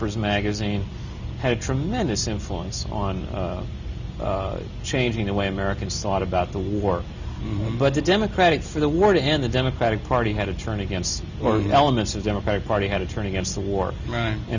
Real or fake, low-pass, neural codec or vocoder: real; 7.2 kHz; none